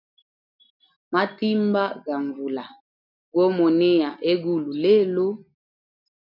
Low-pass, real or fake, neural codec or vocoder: 5.4 kHz; real; none